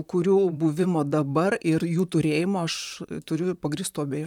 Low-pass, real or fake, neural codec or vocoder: 19.8 kHz; fake; vocoder, 44.1 kHz, 128 mel bands, Pupu-Vocoder